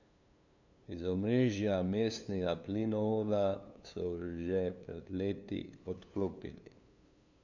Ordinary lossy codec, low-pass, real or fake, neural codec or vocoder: none; 7.2 kHz; fake; codec, 16 kHz, 2 kbps, FunCodec, trained on LibriTTS, 25 frames a second